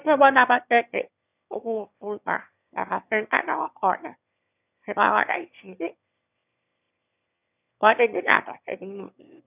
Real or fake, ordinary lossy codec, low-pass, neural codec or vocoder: fake; none; 3.6 kHz; autoencoder, 22.05 kHz, a latent of 192 numbers a frame, VITS, trained on one speaker